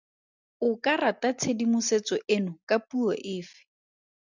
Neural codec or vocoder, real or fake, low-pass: none; real; 7.2 kHz